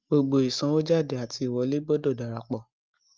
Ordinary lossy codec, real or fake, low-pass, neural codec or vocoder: Opus, 24 kbps; real; 7.2 kHz; none